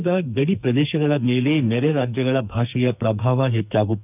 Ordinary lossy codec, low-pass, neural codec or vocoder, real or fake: none; 3.6 kHz; codec, 44.1 kHz, 2.6 kbps, SNAC; fake